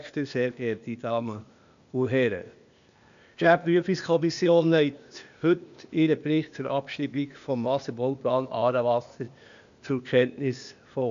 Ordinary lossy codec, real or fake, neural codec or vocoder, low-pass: AAC, 96 kbps; fake; codec, 16 kHz, 0.8 kbps, ZipCodec; 7.2 kHz